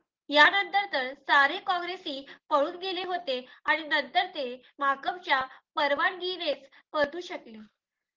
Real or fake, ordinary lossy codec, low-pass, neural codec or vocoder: real; Opus, 16 kbps; 7.2 kHz; none